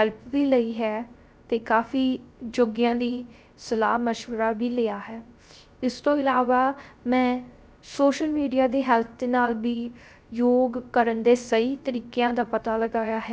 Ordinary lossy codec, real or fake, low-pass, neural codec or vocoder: none; fake; none; codec, 16 kHz, 0.3 kbps, FocalCodec